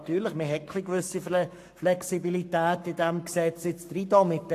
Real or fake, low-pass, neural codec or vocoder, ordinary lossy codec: fake; 14.4 kHz; codec, 44.1 kHz, 7.8 kbps, Pupu-Codec; AAC, 64 kbps